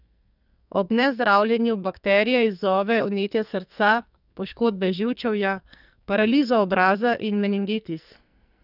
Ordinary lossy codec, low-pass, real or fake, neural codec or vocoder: none; 5.4 kHz; fake; codec, 44.1 kHz, 2.6 kbps, SNAC